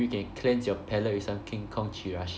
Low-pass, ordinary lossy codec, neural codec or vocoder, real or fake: none; none; none; real